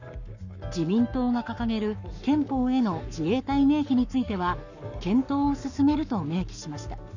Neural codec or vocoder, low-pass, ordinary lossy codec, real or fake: codec, 44.1 kHz, 7.8 kbps, Pupu-Codec; 7.2 kHz; none; fake